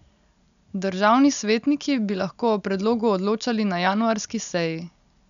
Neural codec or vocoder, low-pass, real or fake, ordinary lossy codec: none; 7.2 kHz; real; MP3, 96 kbps